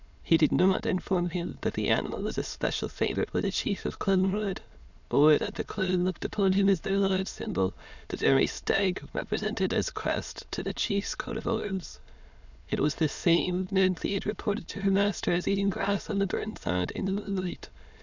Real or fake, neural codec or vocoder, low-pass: fake; autoencoder, 22.05 kHz, a latent of 192 numbers a frame, VITS, trained on many speakers; 7.2 kHz